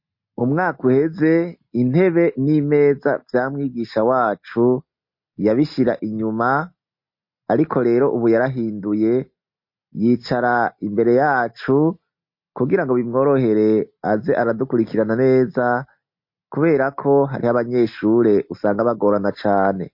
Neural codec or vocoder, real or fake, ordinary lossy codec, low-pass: none; real; MP3, 32 kbps; 5.4 kHz